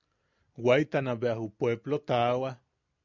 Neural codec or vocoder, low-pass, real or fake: none; 7.2 kHz; real